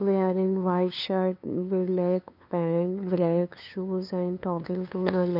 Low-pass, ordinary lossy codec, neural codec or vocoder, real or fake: 5.4 kHz; none; codec, 16 kHz, 2 kbps, FunCodec, trained on LibriTTS, 25 frames a second; fake